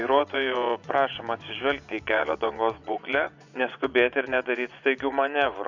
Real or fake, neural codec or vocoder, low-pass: fake; vocoder, 24 kHz, 100 mel bands, Vocos; 7.2 kHz